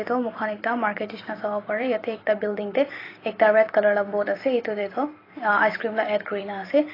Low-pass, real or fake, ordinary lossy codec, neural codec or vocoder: 5.4 kHz; fake; AAC, 24 kbps; vocoder, 44.1 kHz, 128 mel bands every 256 samples, BigVGAN v2